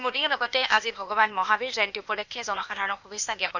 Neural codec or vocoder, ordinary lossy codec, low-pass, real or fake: codec, 16 kHz, 0.8 kbps, ZipCodec; none; 7.2 kHz; fake